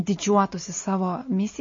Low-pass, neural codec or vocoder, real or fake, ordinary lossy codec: 7.2 kHz; none; real; MP3, 32 kbps